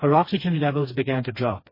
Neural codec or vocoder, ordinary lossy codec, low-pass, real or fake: codec, 16 kHz, 2 kbps, FreqCodec, smaller model; MP3, 24 kbps; 5.4 kHz; fake